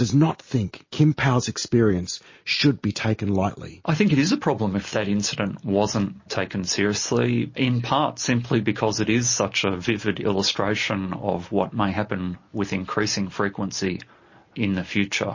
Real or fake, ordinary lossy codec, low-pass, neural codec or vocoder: real; MP3, 32 kbps; 7.2 kHz; none